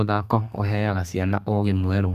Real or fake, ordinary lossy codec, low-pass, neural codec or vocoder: fake; Opus, 64 kbps; 14.4 kHz; codec, 32 kHz, 1.9 kbps, SNAC